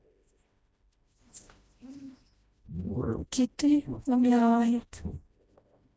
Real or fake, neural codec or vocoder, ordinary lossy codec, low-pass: fake; codec, 16 kHz, 1 kbps, FreqCodec, smaller model; none; none